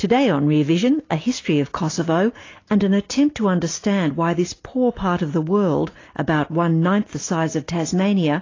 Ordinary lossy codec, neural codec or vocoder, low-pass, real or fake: AAC, 32 kbps; none; 7.2 kHz; real